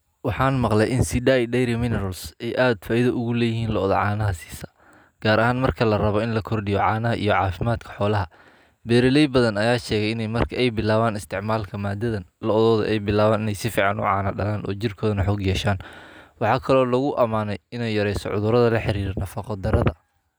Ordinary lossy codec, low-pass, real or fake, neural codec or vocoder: none; none; real; none